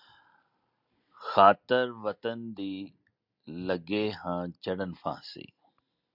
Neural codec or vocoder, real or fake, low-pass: none; real; 5.4 kHz